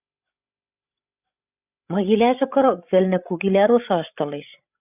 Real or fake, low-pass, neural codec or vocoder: fake; 3.6 kHz; codec, 16 kHz, 16 kbps, FreqCodec, larger model